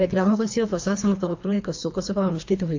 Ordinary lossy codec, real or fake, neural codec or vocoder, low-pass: AAC, 48 kbps; fake; codec, 24 kHz, 3 kbps, HILCodec; 7.2 kHz